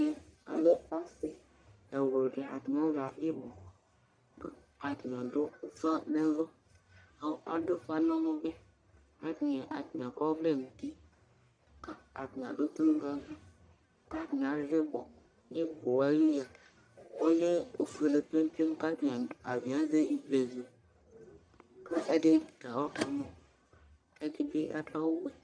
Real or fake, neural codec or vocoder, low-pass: fake; codec, 44.1 kHz, 1.7 kbps, Pupu-Codec; 9.9 kHz